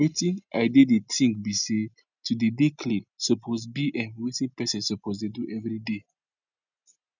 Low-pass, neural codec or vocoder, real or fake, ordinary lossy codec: 7.2 kHz; none; real; none